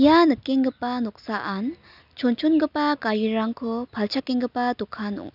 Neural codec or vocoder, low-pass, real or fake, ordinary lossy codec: none; 5.4 kHz; real; none